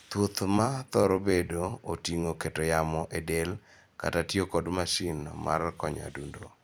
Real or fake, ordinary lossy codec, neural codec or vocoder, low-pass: fake; none; vocoder, 44.1 kHz, 128 mel bands every 256 samples, BigVGAN v2; none